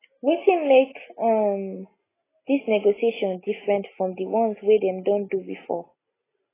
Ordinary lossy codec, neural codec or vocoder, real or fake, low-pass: AAC, 16 kbps; none; real; 3.6 kHz